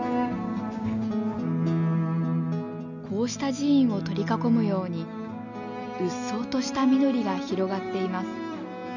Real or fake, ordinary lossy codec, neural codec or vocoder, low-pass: real; none; none; 7.2 kHz